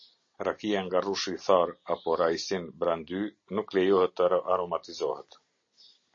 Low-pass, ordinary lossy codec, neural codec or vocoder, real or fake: 7.2 kHz; MP3, 32 kbps; none; real